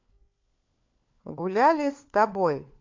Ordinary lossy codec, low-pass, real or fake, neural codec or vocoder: MP3, 48 kbps; 7.2 kHz; fake; codec, 16 kHz, 4 kbps, FunCodec, trained on LibriTTS, 50 frames a second